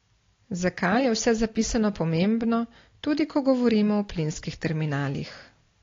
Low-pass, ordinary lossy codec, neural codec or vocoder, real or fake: 7.2 kHz; AAC, 32 kbps; none; real